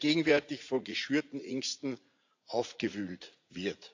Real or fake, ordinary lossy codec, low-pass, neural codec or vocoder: fake; AAC, 48 kbps; 7.2 kHz; vocoder, 44.1 kHz, 80 mel bands, Vocos